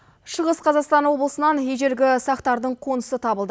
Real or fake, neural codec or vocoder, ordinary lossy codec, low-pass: real; none; none; none